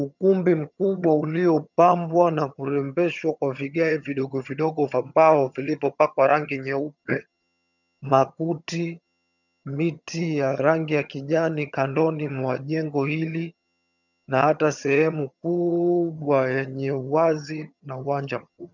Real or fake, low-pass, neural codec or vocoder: fake; 7.2 kHz; vocoder, 22.05 kHz, 80 mel bands, HiFi-GAN